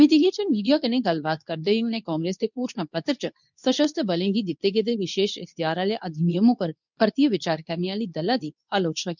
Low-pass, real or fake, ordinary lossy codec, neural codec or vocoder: 7.2 kHz; fake; none; codec, 24 kHz, 0.9 kbps, WavTokenizer, medium speech release version 2